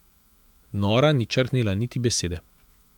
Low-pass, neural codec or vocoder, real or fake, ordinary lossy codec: 19.8 kHz; autoencoder, 48 kHz, 128 numbers a frame, DAC-VAE, trained on Japanese speech; fake; MP3, 96 kbps